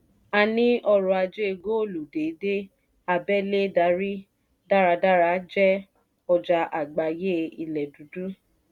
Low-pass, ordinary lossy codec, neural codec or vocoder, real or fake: 19.8 kHz; none; none; real